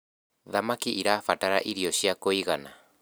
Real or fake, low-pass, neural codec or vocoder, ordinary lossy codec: real; none; none; none